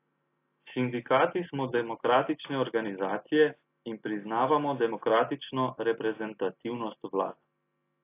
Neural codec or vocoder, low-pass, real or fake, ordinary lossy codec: none; 3.6 kHz; real; AAC, 24 kbps